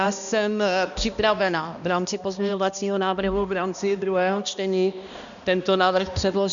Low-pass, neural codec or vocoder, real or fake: 7.2 kHz; codec, 16 kHz, 1 kbps, X-Codec, HuBERT features, trained on balanced general audio; fake